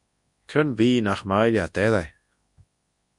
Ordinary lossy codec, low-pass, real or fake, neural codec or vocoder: Opus, 64 kbps; 10.8 kHz; fake; codec, 24 kHz, 0.9 kbps, WavTokenizer, large speech release